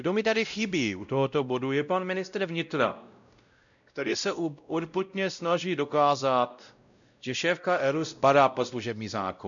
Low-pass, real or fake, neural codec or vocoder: 7.2 kHz; fake; codec, 16 kHz, 0.5 kbps, X-Codec, WavLM features, trained on Multilingual LibriSpeech